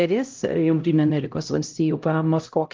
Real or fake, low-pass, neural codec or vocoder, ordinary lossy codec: fake; 7.2 kHz; codec, 16 kHz, 0.5 kbps, X-Codec, HuBERT features, trained on LibriSpeech; Opus, 32 kbps